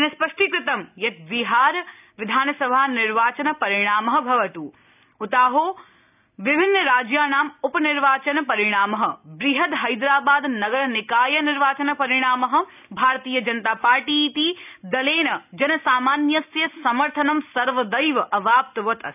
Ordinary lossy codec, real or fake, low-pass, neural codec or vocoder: none; real; 3.6 kHz; none